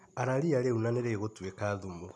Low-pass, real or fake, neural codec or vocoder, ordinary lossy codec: none; real; none; none